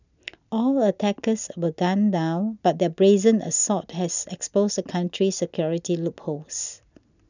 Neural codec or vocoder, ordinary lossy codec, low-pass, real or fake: none; none; 7.2 kHz; real